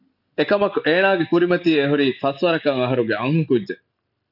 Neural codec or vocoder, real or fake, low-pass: none; real; 5.4 kHz